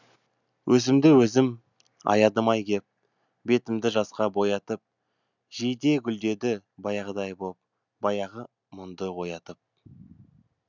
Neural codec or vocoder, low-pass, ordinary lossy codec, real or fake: none; 7.2 kHz; none; real